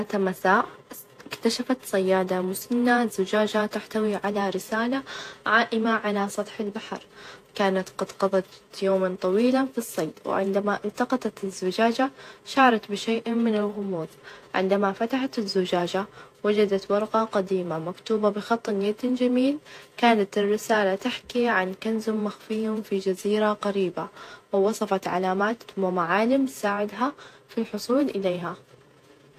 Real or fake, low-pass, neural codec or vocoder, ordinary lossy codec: fake; 14.4 kHz; vocoder, 48 kHz, 128 mel bands, Vocos; AAC, 64 kbps